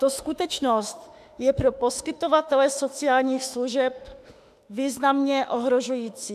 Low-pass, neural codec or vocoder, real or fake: 14.4 kHz; autoencoder, 48 kHz, 32 numbers a frame, DAC-VAE, trained on Japanese speech; fake